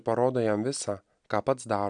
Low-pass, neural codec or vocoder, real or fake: 9.9 kHz; none; real